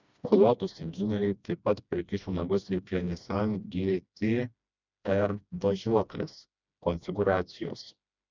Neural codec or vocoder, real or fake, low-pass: codec, 16 kHz, 1 kbps, FreqCodec, smaller model; fake; 7.2 kHz